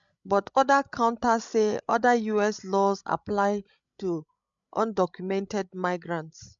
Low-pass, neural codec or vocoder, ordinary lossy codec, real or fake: 7.2 kHz; codec, 16 kHz, 16 kbps, FreqCodec, larger model; MP3, 64 kbps; fake